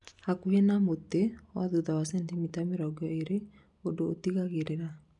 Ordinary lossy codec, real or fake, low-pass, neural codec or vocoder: none; real; 10.8 kHz; none